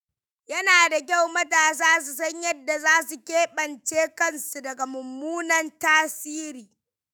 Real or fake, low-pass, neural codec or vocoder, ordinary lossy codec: fake; none; autoencoder, 48 kHz, 128 numbers a frame, DAC-VAE, trained on Japanese speech; none